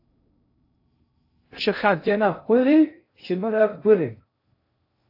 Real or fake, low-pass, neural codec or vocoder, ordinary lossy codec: fake; 5.4 kHz; codec, 16 kHz in and 24 kHz out, 0.6 kbps, FocalCodec, streaming, 2048 codes; AAC, 32 kbps